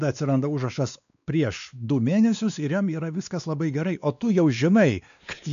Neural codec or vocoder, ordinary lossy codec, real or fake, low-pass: codec, 16 kHz, 2 kbps, X-Codec, WavLM features, trained on Multilingual LibriSpeech; MP3, 96 kbps; fake; 7.2 kHz